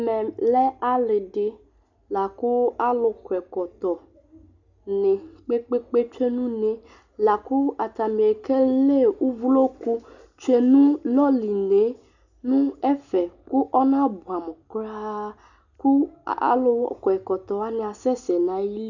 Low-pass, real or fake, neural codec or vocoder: 7.2 kHz; real; none